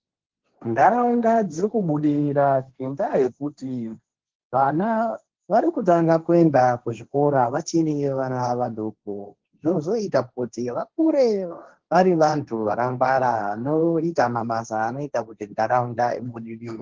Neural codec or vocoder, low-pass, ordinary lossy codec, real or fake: codec, 16 kHz, 1.1 kbps, Voila-Tokenizer; 7.2 kHz; Opus, 16 kbps; fake